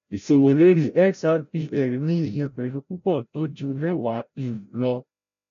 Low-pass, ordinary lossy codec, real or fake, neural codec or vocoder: 7.2 kHz; none; fake; codec, 16 kHz, 0.5 kbps, FreqCodec, larger model